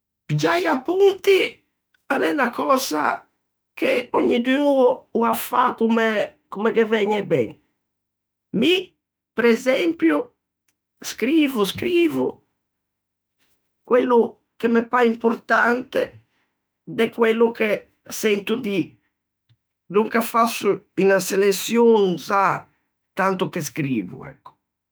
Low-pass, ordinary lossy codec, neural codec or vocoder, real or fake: none; none; autoencoder, 48 kHz, 32 numbers a frame, DAC-VAE, trained on Japanese speech; fake